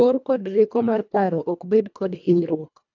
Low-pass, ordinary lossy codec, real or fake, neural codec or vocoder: 7.2 kHz; none; fake; codec, 24 kHz, 1.5 kbps, HILCodec